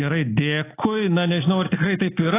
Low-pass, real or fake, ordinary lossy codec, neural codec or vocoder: 3.6 kHz; real; AAC, 16 kbps; none